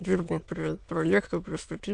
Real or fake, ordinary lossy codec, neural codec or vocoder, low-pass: fake; MP3, 64 kbps; autoencoder, 22.05 kHz, a latent of 192 numbers a frame, VITS, trained on many speakers; 9.9 kHz